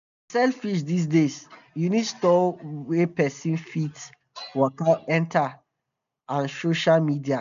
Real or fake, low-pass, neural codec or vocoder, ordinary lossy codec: real; 7.2 kHz; none; none